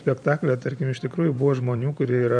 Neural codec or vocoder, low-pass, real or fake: vocoder, 44.1 kHz, 128 mel bands every 512 samples, BigVGAN v2; 9.9 kHz; fake